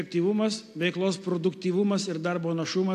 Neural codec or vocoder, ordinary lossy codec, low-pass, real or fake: none; AAC, 64 kbps; 14.4 kHz; real